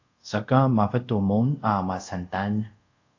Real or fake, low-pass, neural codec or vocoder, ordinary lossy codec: fake; 7.2 kHz; codec, 24 kHz, 0.5 kbps, DualCodec; AAC, 48 kbps